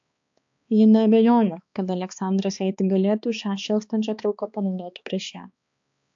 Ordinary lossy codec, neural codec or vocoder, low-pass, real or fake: AAC, 64 kbps; codec, 16 kHz, 2 kbps, X-Codec, HuBERT features, trained on balanced general audio; 7.2 kHz; fake